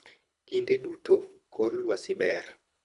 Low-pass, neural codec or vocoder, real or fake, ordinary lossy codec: 10.8 kHz; codec, 24 kHz, 3 kbps, HILCodec; fake; none